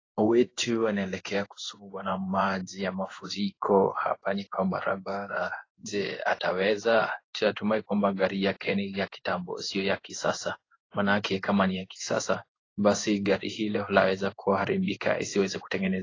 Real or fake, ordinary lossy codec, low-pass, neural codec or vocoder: fake; AAC, 32 kbps; 7.2 kHz; codec, 16 kHz in and 24 kHz out, 1 kbps, XY-Tokenizer